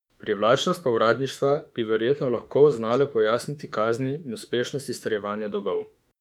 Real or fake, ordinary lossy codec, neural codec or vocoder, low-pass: fake; none; autoencoder, 48 kHz, 32 numbers a frame, DAC-VAE, trained on Japanese speech; 19.8 kHz